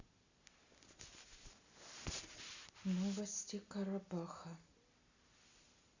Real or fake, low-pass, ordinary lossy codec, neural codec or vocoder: real; 7.2 kHz; Opus, 64 kbps; none